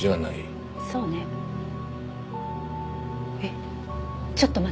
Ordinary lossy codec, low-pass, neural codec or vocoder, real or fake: none; none; none; real